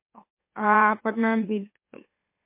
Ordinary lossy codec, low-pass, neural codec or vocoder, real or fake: MP3, 24 kbps; 3.6 kHz; autoencoder, 44.1 kHz, a latent of 192 numbers a frame, MeloTTS; fake